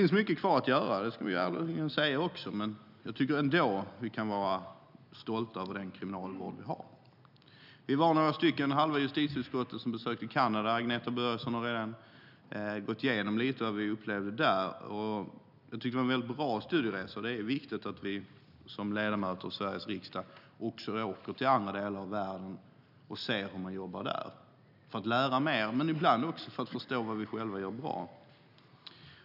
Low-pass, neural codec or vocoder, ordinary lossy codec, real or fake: 5.4 kHz; none; none; real